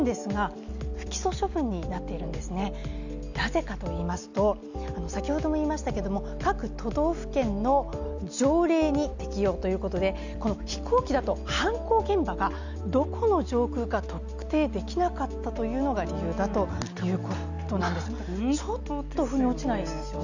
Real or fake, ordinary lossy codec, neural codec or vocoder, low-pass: real; none; none; 7.2 kHz